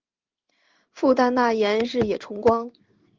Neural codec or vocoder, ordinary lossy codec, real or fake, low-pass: codec, 16 kHz in and 24 kHz out, 1 kbps, XY-Tokenizer; Opus, 32 kbps; fake; 7.2 kHz